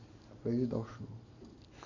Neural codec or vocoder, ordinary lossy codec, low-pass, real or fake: none; none; 7.2 kHz; real